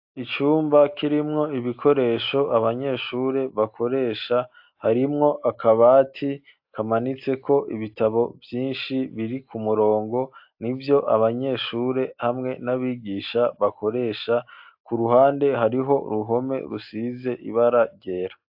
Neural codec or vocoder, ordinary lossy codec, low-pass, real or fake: none; AAC, 48 kbps; 5.4 kHz; real